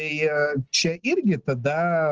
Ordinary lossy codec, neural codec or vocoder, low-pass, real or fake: Opus, 16 kbps; none; 7.2 kHz; real